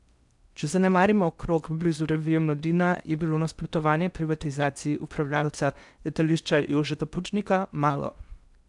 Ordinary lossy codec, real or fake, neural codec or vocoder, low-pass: none; fake; codec, 16 kHz in and 24 kHz out, 0.8 kbps, FocalCodec, streaming, 65536 codes; 10.8 kHz